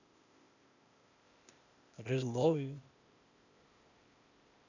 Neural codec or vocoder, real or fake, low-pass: codec, 16 kHz, 0.8 kbps, ZipCodec; fake; 7.2 kHz